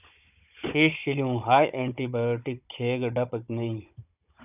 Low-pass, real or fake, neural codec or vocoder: 3.6 kHz; fake; codec, 16 kHz, 16 kbps, FunCodec, trained on Chinese and English, 50 frames a second